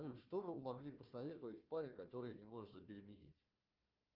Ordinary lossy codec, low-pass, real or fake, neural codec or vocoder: Opus, 24 kbps; 5.4 kHz; fake; codec, 16 kHz, 1 kbps, FunCodec, trained on Chinese and English, 50 frames a second